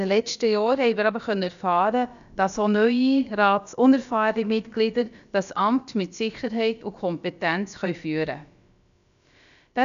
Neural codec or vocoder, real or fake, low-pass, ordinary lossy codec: codec, 16 kHz, about 1 kbps, DyCAST, with the encoder's durations; fake; 7.2 kHz; none